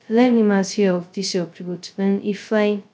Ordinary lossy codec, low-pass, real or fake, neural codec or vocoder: none; none; fake; codec, 16 kHz, 0.2 kbps, FocalCodec